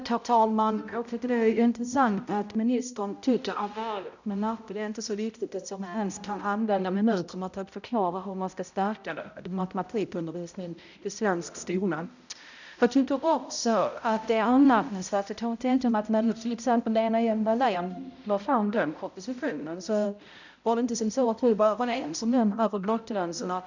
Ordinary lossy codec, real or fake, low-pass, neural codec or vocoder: none; fake; 7.2 kHz; codec, 16 kHz, 0.5 kbps, X-Codec, HuBERT features, trained on balanced general audio